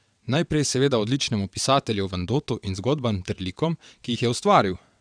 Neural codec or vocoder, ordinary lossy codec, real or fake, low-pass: vocoder, 22.05 kHz, 80 mel bands, WaveNeXt; none; fake; 9.9 kHz